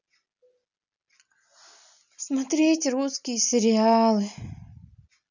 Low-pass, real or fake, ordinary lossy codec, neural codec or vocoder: 7.2 kHz; real; none; none